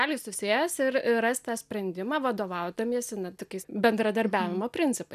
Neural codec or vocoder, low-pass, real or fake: none; 14.4 kHz; real